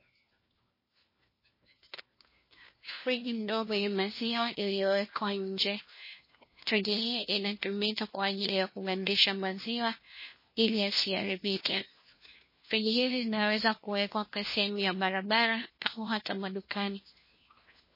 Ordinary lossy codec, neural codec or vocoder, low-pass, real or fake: MP3, 24 kbps; codec, 16 kHz, 1 kbps, FunCodec, trained on LibriTTS, 50 frames a second; 5.4 kHz; fake